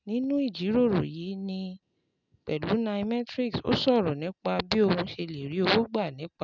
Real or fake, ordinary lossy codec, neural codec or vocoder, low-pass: real; none; none; 7.2 kHz